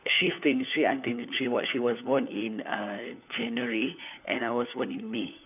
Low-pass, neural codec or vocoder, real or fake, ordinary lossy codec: 3.6 kHz; codec, 16 kHz, 4 kbps, FunCodec, trained on LibriTTS, 50 frames a second; fake; none